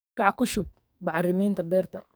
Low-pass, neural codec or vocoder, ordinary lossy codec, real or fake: none; codec, 44.1 kHz, 2.6 kbps, SNAC; none; fake